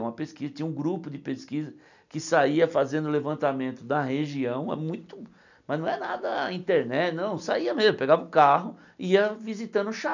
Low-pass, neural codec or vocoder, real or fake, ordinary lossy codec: 7.2 kHz; none; real; none